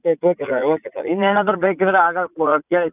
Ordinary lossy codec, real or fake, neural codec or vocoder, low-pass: none; fake; vocoder, 44.1 kHz, 80 mel bands, Vocos; 3.6 kHz